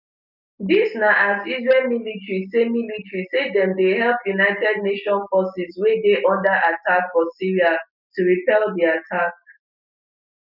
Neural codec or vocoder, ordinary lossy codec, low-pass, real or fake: none; none; 5.4 kHz; real